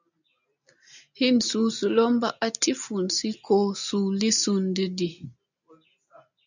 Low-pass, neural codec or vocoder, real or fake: 7.2 kHz; none; real